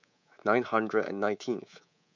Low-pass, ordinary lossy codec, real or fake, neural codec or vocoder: 7.2 kHz; MP3, 64 kbps; fake; codec, 24 kHz, 3.1 kbps, DualCodec